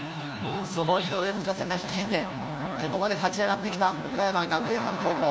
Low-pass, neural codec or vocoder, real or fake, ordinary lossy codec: none; codec, 16 kHz, 1 kbps, FunCodec, trained on LibriTTS, 50 frames a second; fake; none